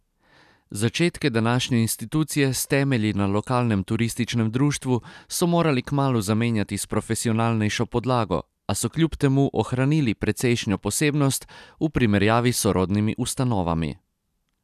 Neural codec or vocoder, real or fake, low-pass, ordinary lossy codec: none; real; 14.4 kHz; none